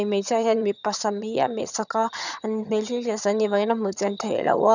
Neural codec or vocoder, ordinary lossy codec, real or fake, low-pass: vocoder, 22.05 kHz, 80 mel bands, HiFi-GAN; none; fake; 7.2 kHz